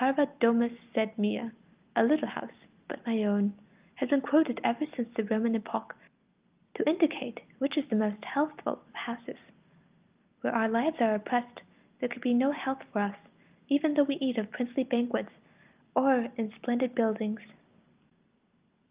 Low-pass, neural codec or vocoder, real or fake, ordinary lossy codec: 3.6 kHz; none; real; Opus, 24 kbps